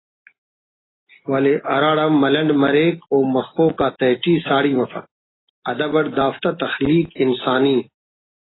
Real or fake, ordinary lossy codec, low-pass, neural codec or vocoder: real; AAC, 16 kbps; 7.2 kHz; none